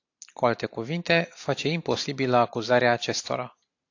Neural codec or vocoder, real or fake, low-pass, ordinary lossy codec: none; real; 7.2 kHz; AAC, 48 kbps